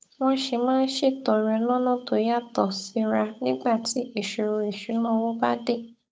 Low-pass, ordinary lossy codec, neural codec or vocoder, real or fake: none; none; codec, 16 kHz, 8 kbps, FunCodec, trained on Chinese and English, 25 frames a second; fake